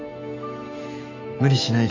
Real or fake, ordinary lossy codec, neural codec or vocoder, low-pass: fake; MP3, 64 kbps; autoencoder, 48 kHz, 128 numbers a frame, DAC-VAE, trained on Japanese speech; 7.2 kHz